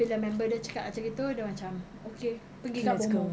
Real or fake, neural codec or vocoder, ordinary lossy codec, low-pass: real; none; none; none